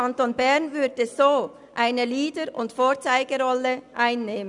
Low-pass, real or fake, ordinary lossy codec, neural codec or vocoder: 10.8 kHz; real; none; none